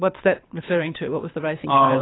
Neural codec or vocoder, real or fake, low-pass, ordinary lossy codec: codec, 24 kHz, 6 kbps, HILCodec; fake; 7.2 kHz; AAC, 16 kbps